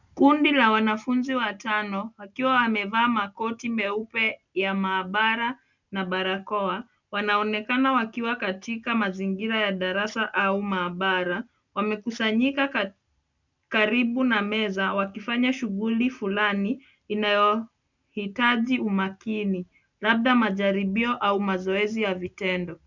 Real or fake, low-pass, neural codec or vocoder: real; 7.2 kHz; none